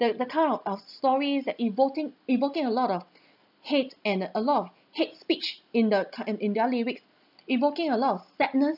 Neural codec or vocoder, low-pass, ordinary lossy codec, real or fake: none; 5.4 kHz; none; real